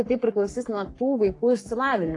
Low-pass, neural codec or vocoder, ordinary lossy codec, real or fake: 10.8 kHz; codec, 44.1 kHz, 3.4 kbps, Pupu-Codec; AAC, 48 kbps; fake